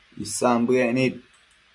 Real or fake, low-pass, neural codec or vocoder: real; 10.8 kHz; none